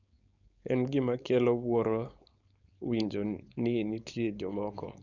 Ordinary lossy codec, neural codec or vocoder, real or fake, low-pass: none; codec, 16 kHz, 4.8 kbps, FACodec; fake; 7.2 kHz